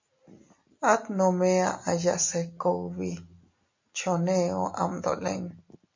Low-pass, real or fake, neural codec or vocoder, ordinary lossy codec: 7.2 kHz; real; none; MP3, 48 kbps